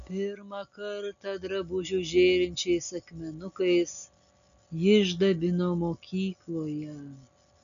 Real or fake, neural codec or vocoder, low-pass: real; none; 7.2 kHz